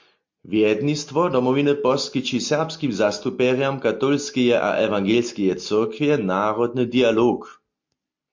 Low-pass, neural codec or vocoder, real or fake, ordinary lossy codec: 7.2 kHz; none; real; MP3, 48 kbps